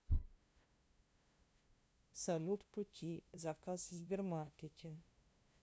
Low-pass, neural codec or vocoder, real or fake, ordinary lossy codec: none; codec, 16 kHz, 0.5 kbps, FunCodec, trained on LibriTTS, 25 frames a second; fake; none